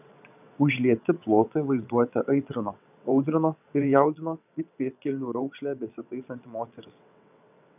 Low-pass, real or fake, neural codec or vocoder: 3.6 kHz; fake; vocoder, 22.05 kHz, 80 mel bands, WaveNeXt